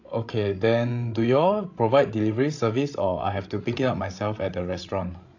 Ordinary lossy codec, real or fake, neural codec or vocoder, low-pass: AAC, 48 kbps; fake; codec, 16 kHz, 16 kbps, FreqCodec, larger model; 7.2 kHz